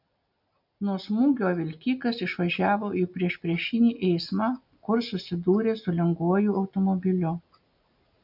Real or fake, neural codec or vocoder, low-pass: real; none; 5.4 kHz